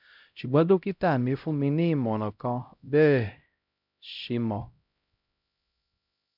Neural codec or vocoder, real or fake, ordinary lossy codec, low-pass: codec, 16 kHz, 0.5 kbps, X-Codec, HuBERT features, trained on LibriSpeech; fake; none; 5.4 kHz